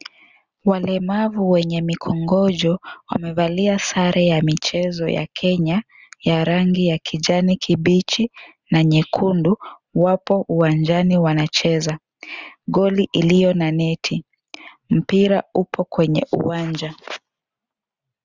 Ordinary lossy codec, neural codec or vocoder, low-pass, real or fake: Opus, 64 kbps; none; 7.2 kHz; real